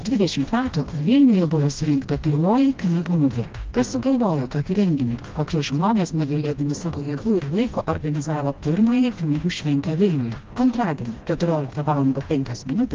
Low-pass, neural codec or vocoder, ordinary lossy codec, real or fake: 7.2 kHz; codec, 16 kHz, 1 kbps, FreqCodec, smaller model; Opus, 24 kbps; fake